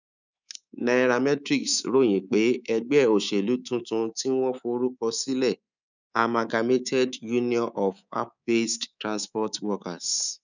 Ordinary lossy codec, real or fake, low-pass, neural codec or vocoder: none; fake; 7.2 kHz; codec, 24 kHz, 3.1 kbps, DualCodec